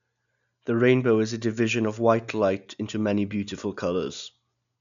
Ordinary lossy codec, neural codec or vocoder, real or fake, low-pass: none; none; real; 7.2 kHz